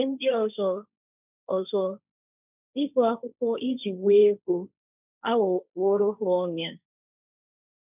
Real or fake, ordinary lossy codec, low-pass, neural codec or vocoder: fake; none; 3.6 kHz; codec, 16 kHz, 1.1 kbps, Voila-Tokenizer